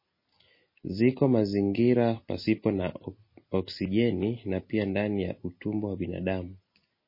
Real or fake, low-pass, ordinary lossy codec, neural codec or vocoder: real; 5.4 kHz; MP3, 24 kbps; none